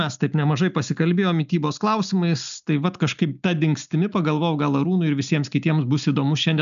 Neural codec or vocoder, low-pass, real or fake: none; 7.2 kHz; real